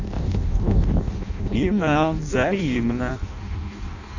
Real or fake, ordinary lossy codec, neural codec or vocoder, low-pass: fake; none; codec, 16 kHz in and 24 kHz out, 0.6 kbps, FireRedTTS-2 codec; 7.2 kHz